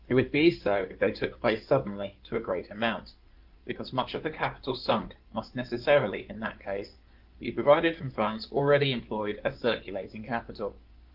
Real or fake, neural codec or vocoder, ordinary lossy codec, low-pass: fake; codec, 16 kHz in and 24 kHz out, 2.2 kbps, FireRedTTS-2 codec; Opus, 24 kbps; 5.4 kHz